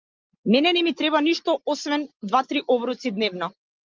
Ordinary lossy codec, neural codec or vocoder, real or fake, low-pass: Opus, 32 kbps; none; real; 7.2 kHz